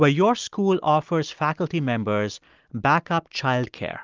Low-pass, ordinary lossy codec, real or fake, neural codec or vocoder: 7.2 kHz; Opus, 24 kbps; real; none